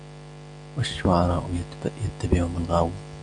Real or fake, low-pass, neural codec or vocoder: real; 9.9 kHz; none